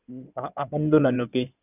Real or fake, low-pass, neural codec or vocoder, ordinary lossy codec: fake; 3.6 kHz; codec, 16 kHz in and 24 kHz out, 2.2 kbps, FireRedTTS-2 codec; none